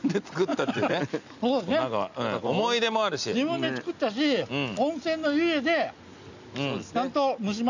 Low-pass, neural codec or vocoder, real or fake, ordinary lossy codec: 7.2 kHz; none; real; none